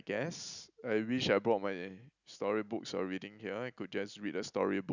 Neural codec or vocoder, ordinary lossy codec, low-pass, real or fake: none; none; 7.2 kHz; real